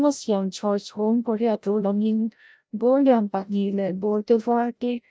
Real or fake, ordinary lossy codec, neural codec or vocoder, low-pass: fake; none; codec, 16 kHz, 0.5 kbps, FreqCodec, larger model; none